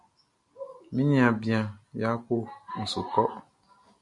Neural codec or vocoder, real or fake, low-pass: none; real; 10.8 kHz